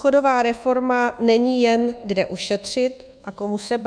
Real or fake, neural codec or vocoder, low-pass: fake; codec, 24 kHz, 1.2 kbps, DualCodec; 9.9 kHz